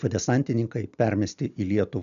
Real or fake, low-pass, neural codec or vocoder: real; 7.2 kHz; none